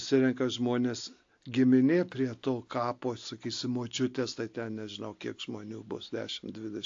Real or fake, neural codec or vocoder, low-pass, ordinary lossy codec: real; none; 7.2 kHz; AAC, 48 kbps